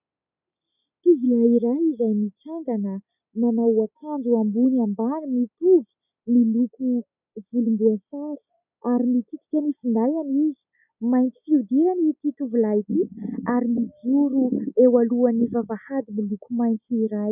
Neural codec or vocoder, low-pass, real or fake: none; 3.6 kHz; real